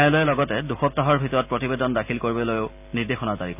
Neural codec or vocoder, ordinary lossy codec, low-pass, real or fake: none; none; 3.6 kHz; real